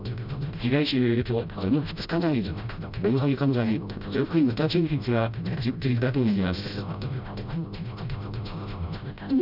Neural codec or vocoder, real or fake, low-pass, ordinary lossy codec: codec, 16 kHz, 0.5 kbps, FreqCodec, smaller model; fake; 5.4 kHz; none